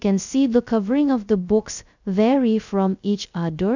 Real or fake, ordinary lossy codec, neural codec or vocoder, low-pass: fake; none; codec, 16 kHz, 0.2 kbps, FocalCodec; 7.2 kHz